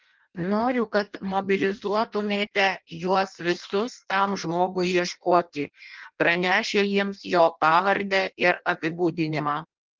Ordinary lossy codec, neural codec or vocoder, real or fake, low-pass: Opus, 32 kbps; codec, 16 kHz in and 24 kHz out, 0.6 kbps, FireRedTTS-2 codec; fake; 7.2 kHz